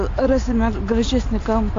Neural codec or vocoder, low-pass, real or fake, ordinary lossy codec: none; 7.2 kHz; real; AAC, 48 kbps